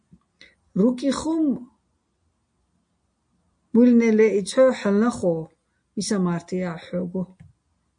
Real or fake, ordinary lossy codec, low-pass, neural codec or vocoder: real; MP3, 48 kbps; 9.9 kHz; none